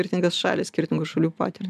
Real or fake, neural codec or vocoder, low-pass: real; none; 14.4 kHz